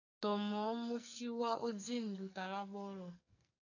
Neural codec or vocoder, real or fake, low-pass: codec, 44.1 kHz, 2.6 kbps, SNAC; fake; 7.2 kHz